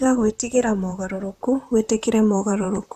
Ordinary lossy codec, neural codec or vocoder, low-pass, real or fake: none; vocoder, 44.1 kHz, 128 mel bands, Pupu-Vocoder; 14.4 kHz; fake